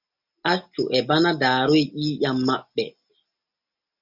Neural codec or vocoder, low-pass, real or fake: none; 5.4 kHz; real